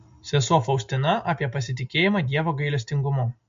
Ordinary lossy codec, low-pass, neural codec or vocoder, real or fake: MP3, 48 kbps; 7.2 kHz; none; real